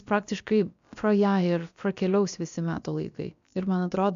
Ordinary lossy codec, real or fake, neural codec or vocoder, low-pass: AAC, 96 kbps; fake; codec, 16 kHz, about 1 kbps, DyCAST, with the encoder's durations; 7.2 kHz